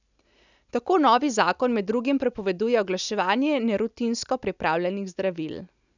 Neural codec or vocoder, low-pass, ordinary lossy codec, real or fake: none; 7.2 kHz; none; real